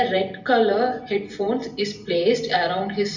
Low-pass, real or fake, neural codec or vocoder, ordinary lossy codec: 7.2 kHz; real; none; none